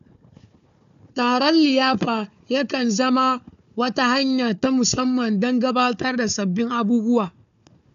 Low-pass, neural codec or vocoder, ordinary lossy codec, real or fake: 7.2 kHz; codec, 16 kHz, 4 kbps, FunCodec, trained on Chinese and English, 50 frames a second; AAC, 96 kbps; fake